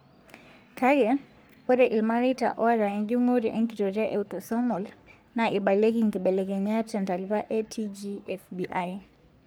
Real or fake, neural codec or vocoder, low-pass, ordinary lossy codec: fake; codec, 44.1 kHz, 3.4 kbps, Pupu-Codec; none; none